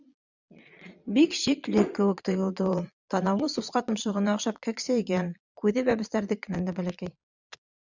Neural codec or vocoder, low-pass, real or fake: vocoder, 22.05 kHz, 80 mel bands, Vocos; 7.2 kHz; fake